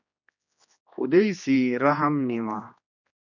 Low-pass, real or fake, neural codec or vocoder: 7.2 kHz; fake; codec, 16 kHz, 2 kbps, X-Codec, HuBERT features, trained on general audio